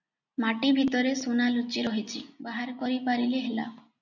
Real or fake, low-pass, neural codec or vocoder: real; 7.2 kHz; none